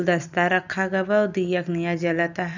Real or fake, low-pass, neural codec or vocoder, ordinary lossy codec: real; 7.2 kHz; none; none